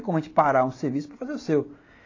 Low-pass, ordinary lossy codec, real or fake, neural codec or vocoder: 7.2 kHz; AAC, 32 kbps; real; none